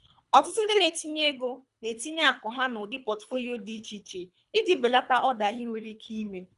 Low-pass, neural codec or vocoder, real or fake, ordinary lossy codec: 10.8 kHz; codec, 24 kHz, 3 kbps, HILCodec; fake; none